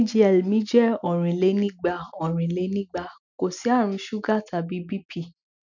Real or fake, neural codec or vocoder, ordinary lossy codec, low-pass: real; none; none; 7.2 kHz